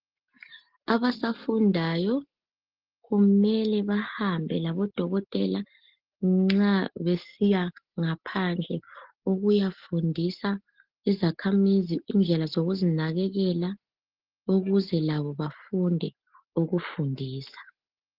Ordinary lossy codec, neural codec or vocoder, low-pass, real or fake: Opus, 16 kbps; none; 5.4 kHz; real